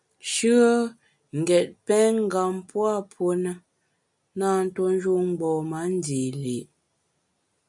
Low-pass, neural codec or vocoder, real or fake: 10.8 kHz; none; real